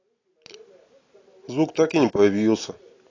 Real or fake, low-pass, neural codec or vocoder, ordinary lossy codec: real; 7.2 kHz; none; AAC, 32 kbps